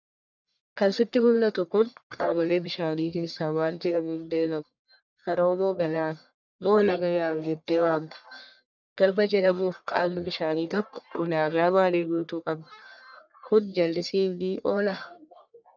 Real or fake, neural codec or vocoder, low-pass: fake; codec, 44.1 kHz, 1.7 kbps, Pupu-Codec; 7.2 kHz